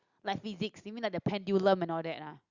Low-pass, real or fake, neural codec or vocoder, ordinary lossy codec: 7.2 kHz; real; none; Opus, 64 kbps